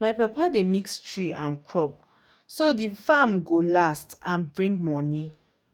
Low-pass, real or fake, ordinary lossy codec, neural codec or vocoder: 19.8 kHz; fake; none; codec, 44.1 kHz, 2.6 kbps, DAC